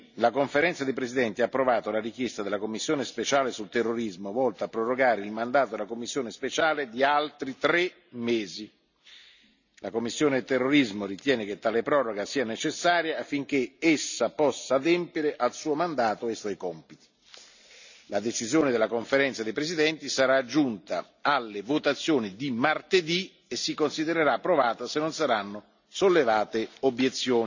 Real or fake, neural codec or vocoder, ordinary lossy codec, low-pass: real; none; none; 7.2 kHz